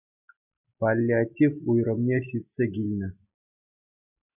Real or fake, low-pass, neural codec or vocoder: real; 3.6 kHz; none